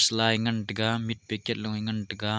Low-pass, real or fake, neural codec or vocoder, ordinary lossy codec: none; real; none; none